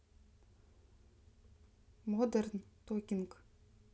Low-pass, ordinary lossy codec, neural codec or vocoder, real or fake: none; none; none; real